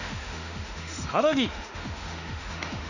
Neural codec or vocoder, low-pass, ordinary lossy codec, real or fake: autoencoder, 48 kHz, 32 numbers a frame, DAC-VAE, trained on Japanese speech; 7.2 kHz; none; fake